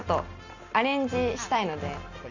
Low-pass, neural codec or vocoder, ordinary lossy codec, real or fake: 7.2 kHz; none; none; real